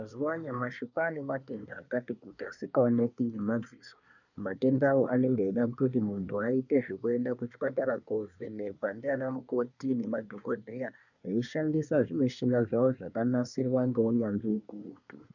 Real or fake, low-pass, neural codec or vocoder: fake; 7.2 kHz; codec, 24 kHz, 1 kbps, SNAC